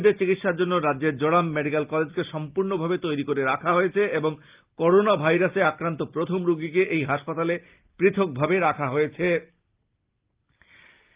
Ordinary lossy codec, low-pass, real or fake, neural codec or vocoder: Opus, 32 kbps; 3.6 kHz; real; none